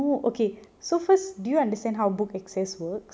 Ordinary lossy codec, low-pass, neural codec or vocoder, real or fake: none; none; none; real